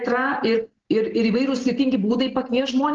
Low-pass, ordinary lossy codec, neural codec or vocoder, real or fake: 7.2 kHz; Opus, 16 kbps; none; real